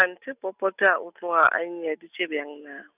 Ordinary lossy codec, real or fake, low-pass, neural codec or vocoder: none; real; 3.6 kHz; none